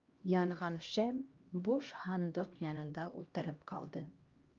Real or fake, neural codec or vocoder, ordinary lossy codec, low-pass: fake; codec, 16 kHz, 1 kbps, X-Codec, HuBERT features, trained on LibriSpeech; Opus, 32 kbps; 7.2 kHz